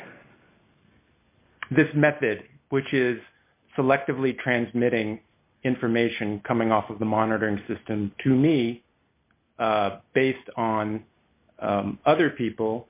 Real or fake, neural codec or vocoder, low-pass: real; none; 3.6 kHz